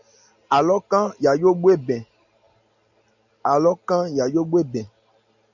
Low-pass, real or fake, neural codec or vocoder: 7.2 kHz; real; none